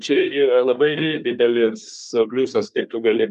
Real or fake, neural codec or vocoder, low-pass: fake; codec, 24 kHz, 1 kbps, SNAC; 10.8 kHz